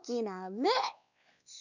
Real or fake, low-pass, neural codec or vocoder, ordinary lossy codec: fake; 7.2 kHz; codec, 16 kHz, 2 kbps, X-Codec, WavLM features, trained on Multilingual LibriSpeech; none